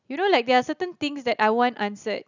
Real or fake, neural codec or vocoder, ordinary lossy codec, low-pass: real; none; none; 7.2 kHz